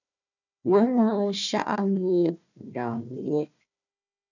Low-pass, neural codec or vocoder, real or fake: 7.2 kHz; codec, 16 kHz, 1 kbps, FunCodec, trained on Chinese and English, 50 frames a second; fake